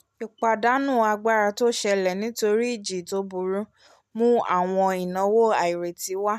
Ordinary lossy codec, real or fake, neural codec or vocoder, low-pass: MP3, 96 kbps; real; none; 14.4 kHz